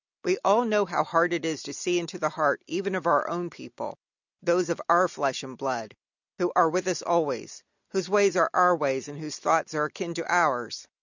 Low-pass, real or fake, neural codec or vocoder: 7.2 kHz; real; none